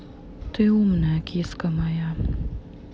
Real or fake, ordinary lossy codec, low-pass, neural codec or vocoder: real; none; none; none